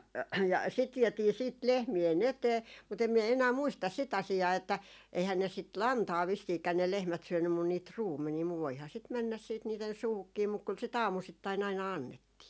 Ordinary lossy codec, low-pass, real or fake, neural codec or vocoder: none; none; real; none